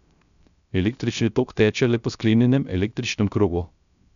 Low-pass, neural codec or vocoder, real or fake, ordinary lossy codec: 7.2 kHz; codec, 16 kHz, 0.3 kbps, FocalCodec; fake; none